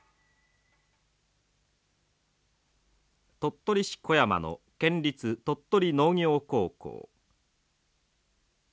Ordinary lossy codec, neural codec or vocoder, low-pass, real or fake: none; none; none; real